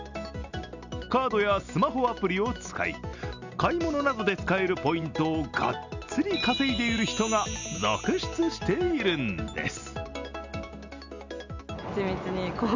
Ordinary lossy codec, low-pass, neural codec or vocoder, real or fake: none; 7.2 kHz; none; real